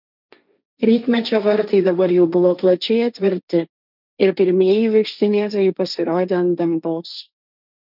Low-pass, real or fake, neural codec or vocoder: 5.4 kHz; fake; codec, 16 kHz, 1.1 kbps, Voila-Tokenizer